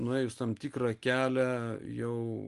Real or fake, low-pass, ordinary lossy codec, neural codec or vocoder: real; 10.8 kHz; Opus, 32 kbps; none